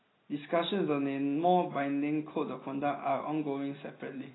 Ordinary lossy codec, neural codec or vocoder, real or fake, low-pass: AAC, 16 kbps; none; real; 7.2 kHz